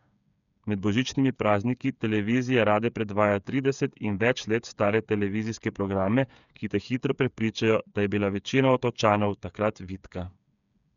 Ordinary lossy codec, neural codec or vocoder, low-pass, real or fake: none; codec, 16 kHz, 8 kbps, FreqCodec, smaller model; 7.2 kHz; fake